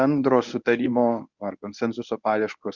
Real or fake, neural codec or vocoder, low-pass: fake; codec, 24 kHz, 0.9 kbps, WavTokenizer, medium speech release version 1; 7.2 kHz